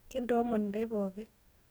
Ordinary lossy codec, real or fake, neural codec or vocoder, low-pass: none; fake; codec, 44.1 kHz, 2.6 kbps, DAC; none